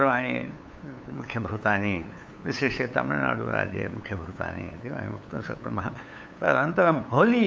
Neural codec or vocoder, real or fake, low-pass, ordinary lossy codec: codec, 16 kHz, 8 kbps, FunCodec, trained on LibriTTS, 25 frames a second; fake; none; none